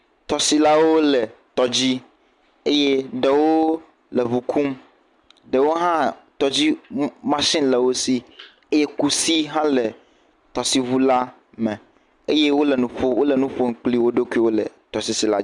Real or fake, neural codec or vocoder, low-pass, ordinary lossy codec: real; none; 10.8 kHz; Opus, 64 kbps